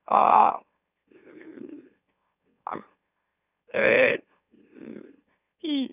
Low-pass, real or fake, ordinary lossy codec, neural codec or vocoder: 3.6 kHz; fake; none; autoencoder, 44.1 kHz, a latent of 192 numbers a frame, MeloTTS